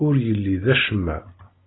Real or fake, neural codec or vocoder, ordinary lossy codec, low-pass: real; none; AAC, 16 kbps; 7.2 kHz